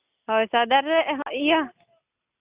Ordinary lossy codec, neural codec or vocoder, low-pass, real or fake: Opus, 64 kbps; none; 3.6 kHz; real